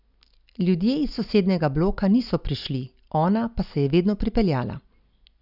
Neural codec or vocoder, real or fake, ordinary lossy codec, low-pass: none; real; none; 5.4 kHz